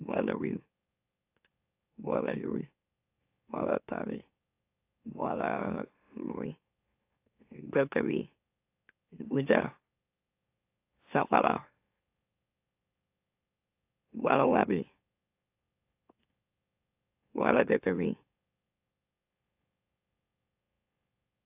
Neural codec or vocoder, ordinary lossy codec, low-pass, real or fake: autoencoder, 44.1 kHz, a latent of 192 numbers a frame, MeloTTS; AAC, 32 kbps; 3.6 kHz; fake